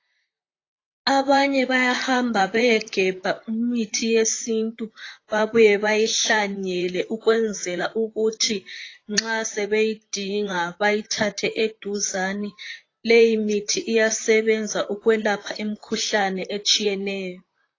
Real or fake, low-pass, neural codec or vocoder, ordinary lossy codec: fake; 7.2 kHz; vocoder, 44.1 kHz, 128 mel bands, Pupu-Vocoder; AAC, 32 kbps